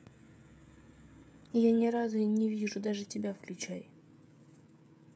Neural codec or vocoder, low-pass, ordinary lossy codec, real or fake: codec, 16 kHz, 16 kbps, FreqCodec, smaller model; none; none; fake